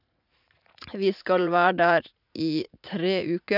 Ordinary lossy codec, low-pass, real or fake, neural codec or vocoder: none; 5.4 kHz; fake; vocoder, 44.1 kHz, 80 mel bands, Vocos